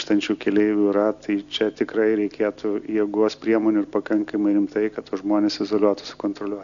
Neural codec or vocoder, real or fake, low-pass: none; real; 7.2 kHz